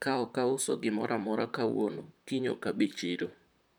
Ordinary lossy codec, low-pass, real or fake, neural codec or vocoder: none; none; fake; vocoder, 44.1 kHz, 128 mel bands, Pupu-Vocoder